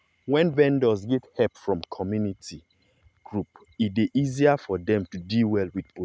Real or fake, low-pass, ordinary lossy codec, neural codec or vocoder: real; none; none; none